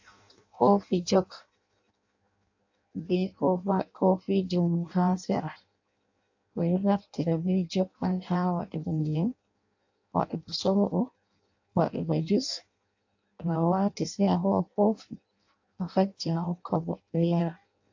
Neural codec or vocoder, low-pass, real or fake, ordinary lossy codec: codec, 16 kHz in and 24 kHz out, 0.6 kbps, FireRedTTS-2 codec; 7.2 kHz; fake; AAC, 48 kbps